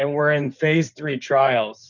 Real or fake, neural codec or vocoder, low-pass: fake; codec, 16 kHz in and 24 kHz out, 2.2 kbps, FireRedTTS-2 codec; 7.2 kHz